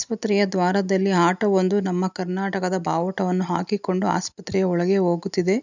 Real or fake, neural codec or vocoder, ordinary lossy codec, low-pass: real; none; none; 7.2 kHz